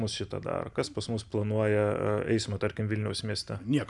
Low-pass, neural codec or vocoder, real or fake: 10.8 kHz; none; real